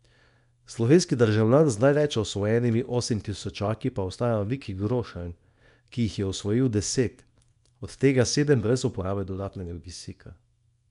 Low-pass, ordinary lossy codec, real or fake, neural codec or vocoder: 10.8 kHz; none; fake; codec, 24 kHz, 0.9 kbps, WavTokenizer, small release